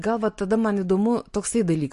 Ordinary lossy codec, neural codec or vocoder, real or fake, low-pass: MP3, 48 kbps; none; real; 14.4 kHz